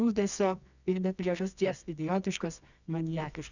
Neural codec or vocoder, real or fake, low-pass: codec, 24 kHz, 0.9 kbps, WavTokenizer, medium music audio release; fake; 7.2 kHz